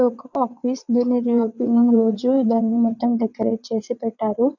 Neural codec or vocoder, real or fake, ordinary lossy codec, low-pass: vocoder, 22.05 kHz, 80 mel bands, WaveNeXt; fake; none; 7.2 kHz